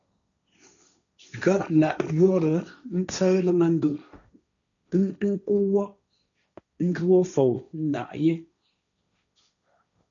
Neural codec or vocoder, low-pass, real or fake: codec, 16 kHz, 1.1 kbps, Voila-Tokenizer; 7.2 kHz; fake